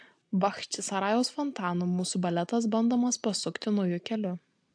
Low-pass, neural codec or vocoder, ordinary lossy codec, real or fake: 9.9 kHz; none; AAC, 64 kbps; real